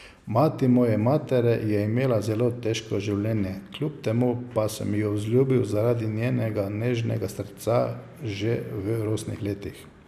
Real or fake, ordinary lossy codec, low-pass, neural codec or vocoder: real; none; 14.4 kHz; none